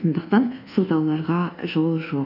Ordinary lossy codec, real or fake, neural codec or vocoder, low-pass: none; fake; codec, 24 kHz, 1.2 kbps, DualCodec; 5.4 kHz